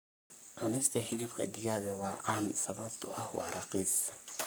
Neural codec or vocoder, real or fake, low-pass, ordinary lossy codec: codec, 44.1 kHz, 3.4 kbps, Pupu-Codec; fake; none; none